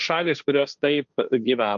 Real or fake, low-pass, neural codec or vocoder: fake; 7.2 kHz; codec, 16 kHz, 1.1 kbps, Voila-Tokenizer